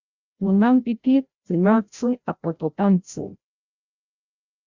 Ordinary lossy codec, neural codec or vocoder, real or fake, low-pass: Opus, 64 kbps; codec, 16 kHz, 0.5 kbps, FreqCodec, larger model; fake; 7.2 kHz